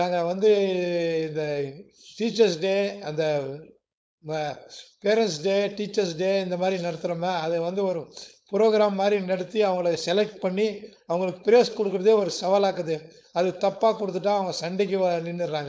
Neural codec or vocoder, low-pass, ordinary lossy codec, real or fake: codec, 16 kHz, 4.8 kbps, FACodec; none; none; fake